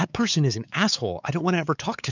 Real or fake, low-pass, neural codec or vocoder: fake; 7.2 kHz; codec, 16 kHz, 4 kbps, X-Codec, WavLM features, trained on Multilingual LibriSpeech